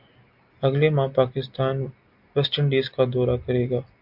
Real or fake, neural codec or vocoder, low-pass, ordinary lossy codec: real; none; 5.4 kHz; MP3, 48 kbps